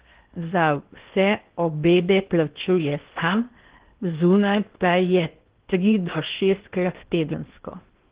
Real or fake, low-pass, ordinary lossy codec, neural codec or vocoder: fake; 3.6 kHz; Opus, 16 kbps; codec, 16 kHz in and 24 kHz out, 0.8 kbps, FocalCodec, streaming, 65536 codes